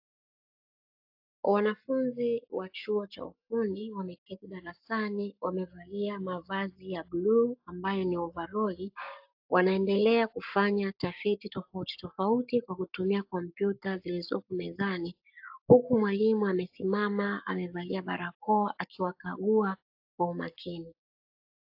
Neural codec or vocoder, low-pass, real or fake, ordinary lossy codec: codec, 44.1 kHz, 7.8 kbps, Pupu-Codec; 5.4 kHz; fake; AAC, 48 kbps